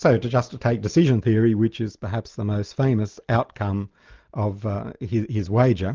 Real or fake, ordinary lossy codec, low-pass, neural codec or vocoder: real; Opus, 32 kbps; 7.2 kHz; none